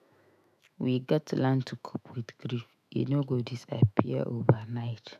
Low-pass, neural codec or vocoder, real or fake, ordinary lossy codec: 14.4 kHz; autoencoder, 48 kHz, 128 numbers a frame, DAC-VAE, trained on Japanese speech; fake; none